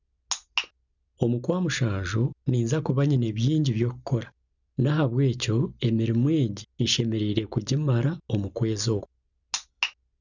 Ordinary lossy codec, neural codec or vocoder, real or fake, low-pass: none; none; real; 7.2 kHz